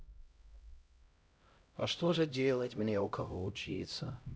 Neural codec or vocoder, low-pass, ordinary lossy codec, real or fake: codec, 16 kHz, 0.5 kbps, X-Codec, HuBERT features, trained on LibriSpeech; none; none; fake